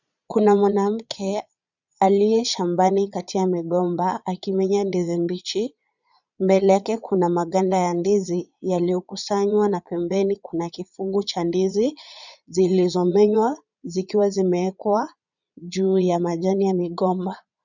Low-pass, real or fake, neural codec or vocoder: 7.2 kHz; fake; vocoder, 22.05 kHz, 80 mel bands, Vocos